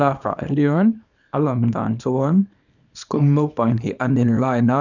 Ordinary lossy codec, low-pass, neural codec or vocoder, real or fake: none; 7.2 kHz; codec, 24 kHz, 0.9 kbps, WavTokenizer, small release; fake